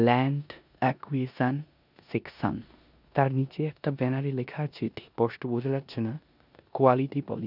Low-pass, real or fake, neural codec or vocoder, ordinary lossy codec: 5.4 kHz; fake; codec, 16 kHz in and 24 kHz out, 0.9 kbps, LongCat-Audio-Codec, four codebook decoder; none